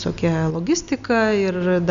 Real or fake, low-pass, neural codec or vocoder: real; 7.2 kHz; none